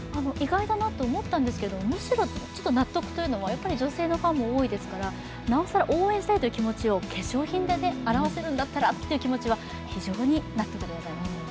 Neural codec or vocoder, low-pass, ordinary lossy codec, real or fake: none; none; none; real